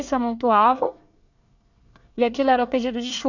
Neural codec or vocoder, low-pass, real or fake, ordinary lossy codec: codec, 24 kHz, 1 kbps, SNAC; 7.2 kHz; fake; none